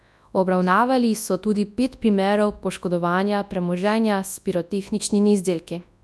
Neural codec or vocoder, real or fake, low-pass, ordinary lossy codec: codec, 24 kHz, 0.9 kbps, WavTokenizer, large speech release; fake; none; none